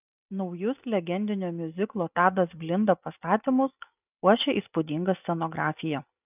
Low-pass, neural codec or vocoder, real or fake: 3.6 kHz; none; real